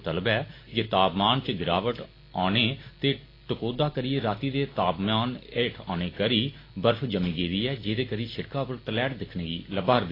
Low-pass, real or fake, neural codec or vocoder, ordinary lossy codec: 5.4 kHz; real; none; AAC, 24 kbps